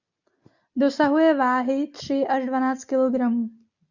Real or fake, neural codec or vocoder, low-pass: real; none; 7.2 kHz